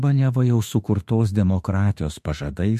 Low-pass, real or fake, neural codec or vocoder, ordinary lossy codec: 14.4 kHz; fake; autoencoder, 48 kHz, 32 numbers a frame, DAC-VAE, trained on Japanese speech; MP3, 64 kbps